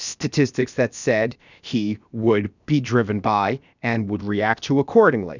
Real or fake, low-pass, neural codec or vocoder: fake; 7.2 kHz; codec, 16 kHz, 0.7 kbps, FocalCodec